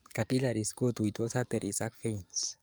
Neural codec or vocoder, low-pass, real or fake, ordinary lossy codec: codec, 44.1 kHz, 7.8 kbps, DAC; none; fake; none